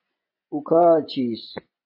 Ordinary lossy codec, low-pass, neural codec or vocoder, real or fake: MP3, 32 kbps; 5.4 kHz; vocoder, 24 kHz, 100 mel bands, Vocos; fake